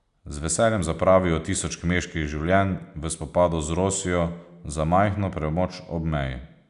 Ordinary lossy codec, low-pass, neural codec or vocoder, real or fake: none; 10.8 kHz; none; real